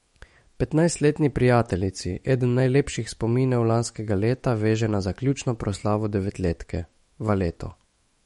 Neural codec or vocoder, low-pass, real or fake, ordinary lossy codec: autoencoder, 48 kHz, 128 numbers a frame, DAC-VAE, trained on Japanese speech; 19.8 kHz; fake; MP3, 48 kbps